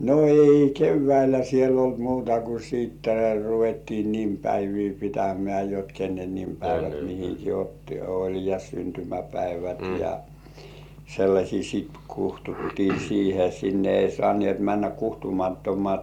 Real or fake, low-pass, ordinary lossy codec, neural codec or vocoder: real; 19.8 kHz; MP3, 96 kbps; none